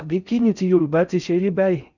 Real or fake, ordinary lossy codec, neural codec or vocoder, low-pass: fake; none; codec, 16 kHz in and 24 kHz out, 0.6 kbps, FocalCodec, streaming, 4096 codes; 7.2 kHz